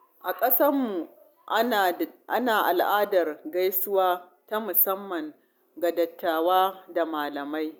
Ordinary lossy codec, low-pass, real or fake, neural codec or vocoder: none; none; real; none